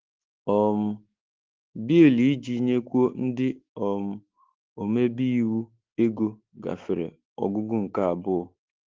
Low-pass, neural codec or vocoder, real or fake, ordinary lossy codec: 7.2 kHz; autoencoder, 48 kHz, 128 numbers a frame, DAC-VAE, trained on Japanese speech; fake; Opus, 16 kbps